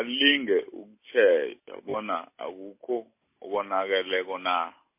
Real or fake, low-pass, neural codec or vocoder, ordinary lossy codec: real; 3.6 kHz; none; MP3, 24 kbps